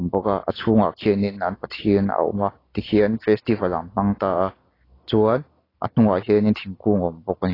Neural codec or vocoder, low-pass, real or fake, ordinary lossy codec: none; 5.4 kHz; real; AAC, 24 kbps